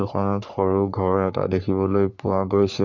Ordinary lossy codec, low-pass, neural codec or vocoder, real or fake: none; 7.2 kHz; codec, 44.1 kHz, 3.4 kbps, Pupu-Codec; fake